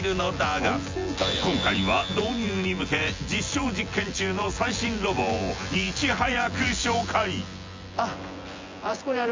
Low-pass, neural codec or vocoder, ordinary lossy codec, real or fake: 7.2 kHz; vocoder, 24 kHz, 100 mel bands, Vocos; MP3, 48 kbps; fake